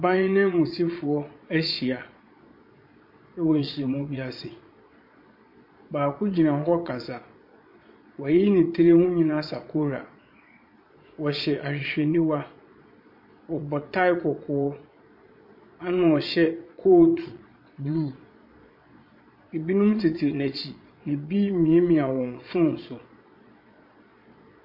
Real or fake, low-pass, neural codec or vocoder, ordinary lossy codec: fake; 5.4 kHz; vocoder, 22.05 kHz, 80 mel bands, Vocos; MP3, 32 kbps